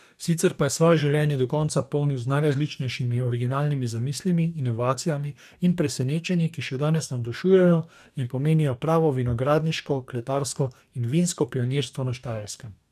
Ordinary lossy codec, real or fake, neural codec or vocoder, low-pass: none; fake; codec, 44.1 kHz, 2.6 kbps, DAC; 14.4 kHz